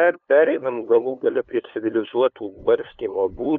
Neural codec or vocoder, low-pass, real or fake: codec, 16 kHz, 2 kbps, FunCodec, trained on LibriTTS, 25 frames a second; 7.2 kHz; fake